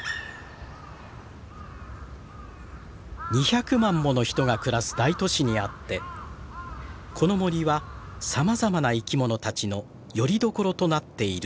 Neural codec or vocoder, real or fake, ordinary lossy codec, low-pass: none; real; none; none